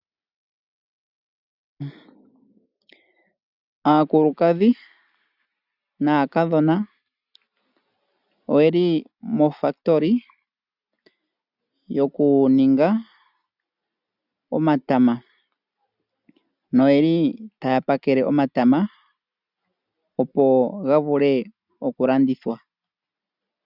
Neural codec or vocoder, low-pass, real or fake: none; 5.4 kHz; real